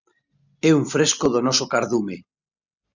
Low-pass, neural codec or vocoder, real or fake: 7.2 kHz; none; real